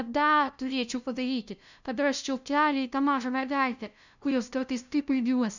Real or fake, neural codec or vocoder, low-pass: fake; codec, 16 kHz, 0.5 kbps, FunCodec, trained on LibriTTS, 25 frames a second; 7.2 kHz